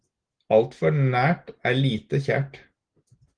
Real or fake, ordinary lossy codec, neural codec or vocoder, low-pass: real; Opus, 16 kbps; none; 9.9 kHz